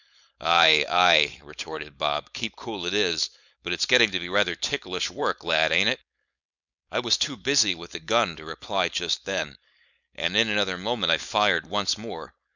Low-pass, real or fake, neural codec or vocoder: 7.2 kHz; fake; codec, 16 kHz, 4.8 kbps, FACodec